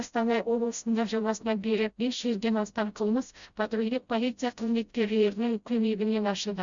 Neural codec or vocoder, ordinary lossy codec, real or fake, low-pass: codec, 16 kHz, 0.5 kbps, FreqCodec, smaller model; Opus, 64 kbps; fake; 7.2 kHz